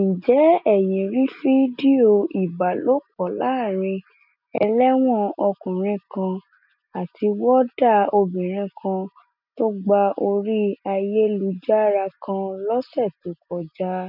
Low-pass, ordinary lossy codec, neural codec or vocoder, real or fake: 5.4 kHz; none; none; real